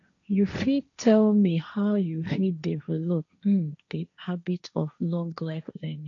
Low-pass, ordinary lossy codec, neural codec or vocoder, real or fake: 7.2 kHz; none; codec, 16 kHz, 1.1 kbps, Voila-Tokenizer; fake